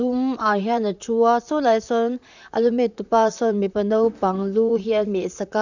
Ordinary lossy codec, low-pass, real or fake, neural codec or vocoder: none; 7.2 kHz; fake; vocoder, 44.1 kHz, 128 mel bands, Pupu-Vocoder